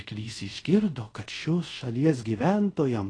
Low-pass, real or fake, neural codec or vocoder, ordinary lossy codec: 9.9 kHz; fake; codec, 24 kHz, 0.5 kbps, DualCodec; AAC, 32 kbps